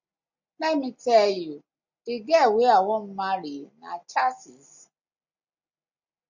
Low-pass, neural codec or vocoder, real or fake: 7.2 kHz; none; real